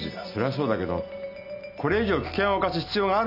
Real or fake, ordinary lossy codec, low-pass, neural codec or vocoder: real; none; 5.4 kHz; none